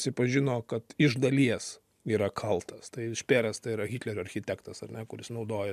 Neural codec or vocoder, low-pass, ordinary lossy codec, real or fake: none; 14.4 kHz; MP3, 96 kbps; real